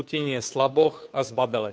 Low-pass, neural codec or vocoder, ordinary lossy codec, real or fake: none; codec, 16 kHz, 2 kbps, FunCodec, trained on Chinese and English, 25 frames a second; none; fake